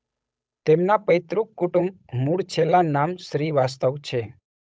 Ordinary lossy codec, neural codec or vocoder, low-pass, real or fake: none; codec, 16 kHz, 8 kbps, FunCodec, trained on Chinese and English, 25 frames a second; none; fake